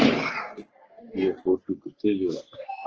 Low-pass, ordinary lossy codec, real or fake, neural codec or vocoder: 7.2 kHz; Opus, 16 kbps; fake; codec, 44.1 kHz, 3.4 kbps, Pupu-Codec